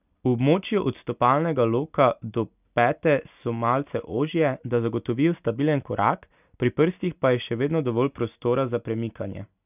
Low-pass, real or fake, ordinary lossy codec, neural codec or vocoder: 3.6 kHz; real; none; none